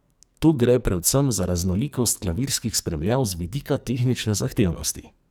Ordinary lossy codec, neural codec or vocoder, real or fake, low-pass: none; codec, 44.1 kHz, 2.6 kbps, SNAC; fake; none